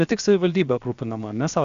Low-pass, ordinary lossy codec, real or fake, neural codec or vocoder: 7.2 kHz; Opus, 64 kbps; fake; codec, 16 kHz, about 1 kbps, DyCAST, with the encoder's durations